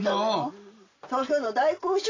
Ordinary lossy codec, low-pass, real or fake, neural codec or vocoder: AAC, 32 kbps; 7.2 kHz; real; none